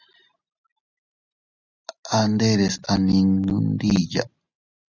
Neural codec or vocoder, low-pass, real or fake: none; 7.2 kHz; real